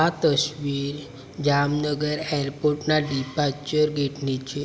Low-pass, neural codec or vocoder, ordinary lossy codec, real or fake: none; none; none; real